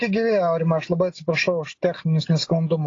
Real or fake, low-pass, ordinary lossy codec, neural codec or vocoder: real; 7.2 kHz; AAC, 32 kbps; none